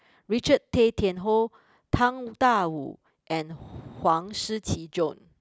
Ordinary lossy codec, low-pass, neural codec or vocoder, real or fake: none; none; none; real